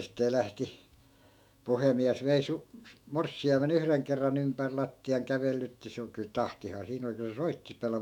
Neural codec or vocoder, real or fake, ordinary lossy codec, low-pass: autoencoder, 48 kHz, 128 numbers a frame, DAC-VAE, trained on Japanese speech; fake; none; 19.8 kHz